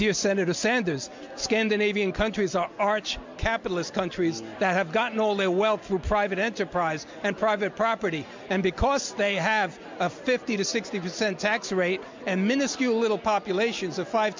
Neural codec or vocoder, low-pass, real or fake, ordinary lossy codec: none; 7.2 kHz; real; MP3, 64 kbps